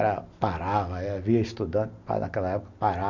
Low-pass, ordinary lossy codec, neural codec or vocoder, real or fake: 7.2 kHz; none; none; real